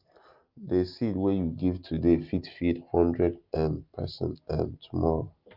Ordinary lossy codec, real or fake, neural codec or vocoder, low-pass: Opus, 24 kbps; real; none; 5.4 kHz